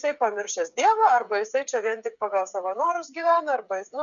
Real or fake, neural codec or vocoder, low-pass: fake; codec, 16 kHz, 8 kbps, FreqCodec, smaller model; 7.2 kHz